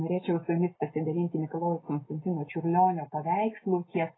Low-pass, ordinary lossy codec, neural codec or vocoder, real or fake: 7.2 kHz; AAC, 16 kbps; none; real